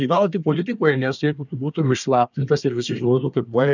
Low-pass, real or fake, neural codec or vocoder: 7.2 kHz; fake; codec, 24 kHz, 1 kbps, SNAC